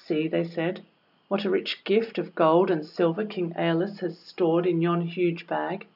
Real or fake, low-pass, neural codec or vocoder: real; 5.4 kHz; none